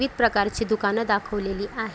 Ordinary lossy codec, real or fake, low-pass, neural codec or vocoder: none; real; none; none